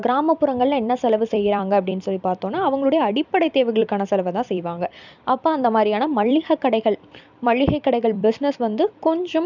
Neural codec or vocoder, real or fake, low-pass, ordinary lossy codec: vocoder, 44.1 kHz, 128 mel bands every 256 samples, BigVGAN v2; fake; 7.2 kHz; none